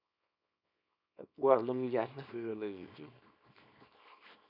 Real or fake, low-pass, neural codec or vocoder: fake; 5.4 kHz; codec, 24 kHz, 0.9 kbps, WavTokenizer, small release